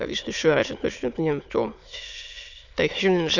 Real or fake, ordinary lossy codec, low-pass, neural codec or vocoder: fake; none; 7.2 kHz; autoencoder, 22.05 kHz, a latent of 192 numbers a frame, VITS, trained on many speakers